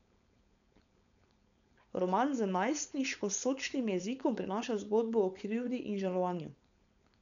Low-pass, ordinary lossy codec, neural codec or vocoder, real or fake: 7.2 kHz; none; codec, 16 kHz, 4.8 kbps, FACodec; fake